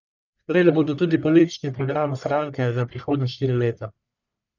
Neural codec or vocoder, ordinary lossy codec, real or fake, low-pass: codec, 44.1 kHz, 1.7 kbps, Pupu-Codec; none; fake; 7.2 kHz